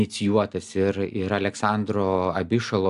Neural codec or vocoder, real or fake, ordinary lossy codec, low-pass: none; real; AAC, 96 kbps; 10.8 kHz